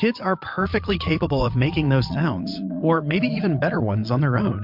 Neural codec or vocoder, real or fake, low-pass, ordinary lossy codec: vocoder, 22.05 kHz, 80 mel bands, Vocos; fake; 5.4 kHz; MP3, 48 kbps